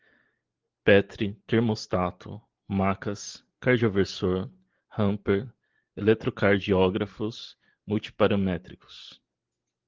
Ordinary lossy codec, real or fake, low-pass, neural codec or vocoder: Opus, 16 kbps; real; 7.2 kHz; none